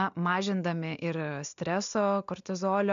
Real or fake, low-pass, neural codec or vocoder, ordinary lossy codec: real; 7.2 kHz; none; MP3, 64 kbps